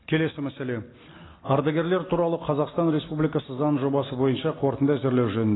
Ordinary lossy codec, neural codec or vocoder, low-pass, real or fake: AAC, 16 kbps; none; 7.2 kHz; real